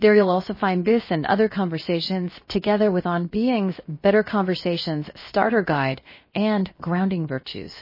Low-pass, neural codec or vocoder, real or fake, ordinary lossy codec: 5.4 kHz; codec, 16 kHz, 0.7 kbps, FocalCodec; fake; MP3, 24 kbps